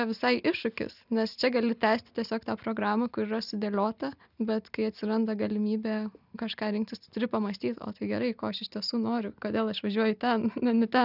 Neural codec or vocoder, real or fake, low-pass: none; real; 5.4 kHz